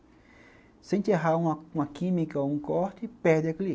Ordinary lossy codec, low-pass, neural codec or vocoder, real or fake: none; none; none; real